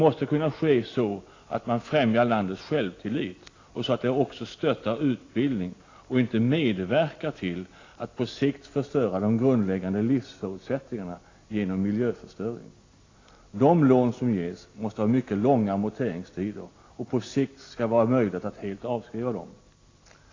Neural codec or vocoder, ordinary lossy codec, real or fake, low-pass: none; AAC, 32 kbps; real; 7.2 kHz